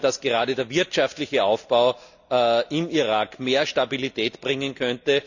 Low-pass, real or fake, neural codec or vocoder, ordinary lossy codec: 7.2 kHz; real; none; none